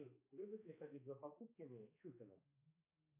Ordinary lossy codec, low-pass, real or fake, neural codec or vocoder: MP3, 24 kbps; 3.6 kHz; fake; codec, 16 kHz, 2 kbps, X-Codec, HuBERT features, trained on balanced general audio